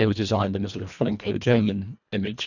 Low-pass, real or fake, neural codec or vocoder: 7.2 kHz; fake; codec, 24 kHz, 1.5 kbps, HILCodec